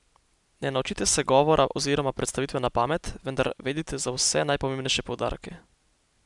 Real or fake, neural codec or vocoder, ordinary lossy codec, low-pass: real; none; none; 10.8 kHz